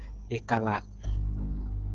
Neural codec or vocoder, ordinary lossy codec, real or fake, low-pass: codec, 16 kHz, 8 kbps, FreqCodec, smaller model; Opus, 16 kbps; fake; 7.2 kHz